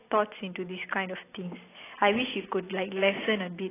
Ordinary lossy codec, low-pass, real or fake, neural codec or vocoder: AAC, 16 kbps; 3.6 kHz; real; none